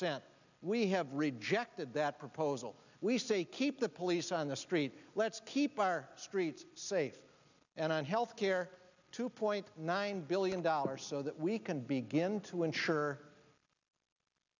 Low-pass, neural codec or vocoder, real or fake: 7.2 kHz; none; real